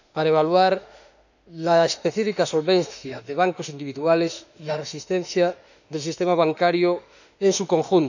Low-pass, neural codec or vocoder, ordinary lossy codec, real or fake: 7.2 kHz; autoencoder, 48 kHz, 32 numbers a frame, DAC-VAE, trained on Japanese speech; none; fake